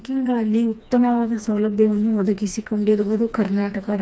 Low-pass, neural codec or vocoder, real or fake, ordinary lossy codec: none; codec, 16 kHz, 2 kbps, FreqCodec, smaller model; fake; none